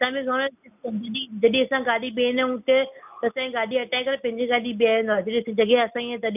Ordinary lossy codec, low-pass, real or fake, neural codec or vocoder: none; 3.6 kHz; real; none